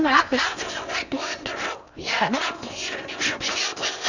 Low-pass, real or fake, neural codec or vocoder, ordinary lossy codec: 7.2 kHz; fake; codec, 16 kHz in and 24 kHz out, 0.6 kbps, FocalCodec, streaming, 4096 codes; none